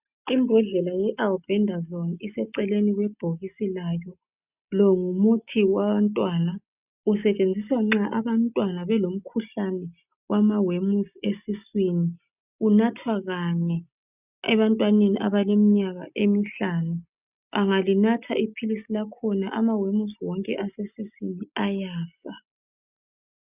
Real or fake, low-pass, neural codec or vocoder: real; 3.6 kHz; none